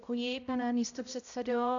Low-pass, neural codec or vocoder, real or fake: 7.2 kHz; codec, 16 kHz, 0.5 kbps, X-Codec, HuBERT features, trained on balanced general audio; fake